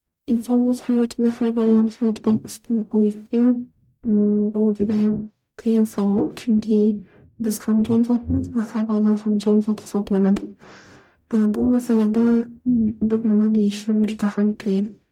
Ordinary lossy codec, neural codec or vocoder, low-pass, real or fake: MP3, 96 kbps; codec, 44.1 kHz, 0.9 kbps, DAC; 19.8 kHz; fake